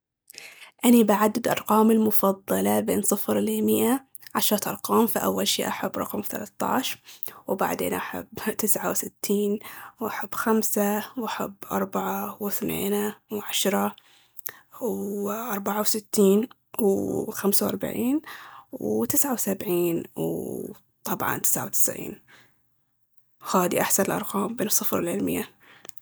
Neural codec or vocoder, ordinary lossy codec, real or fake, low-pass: none; none; real; none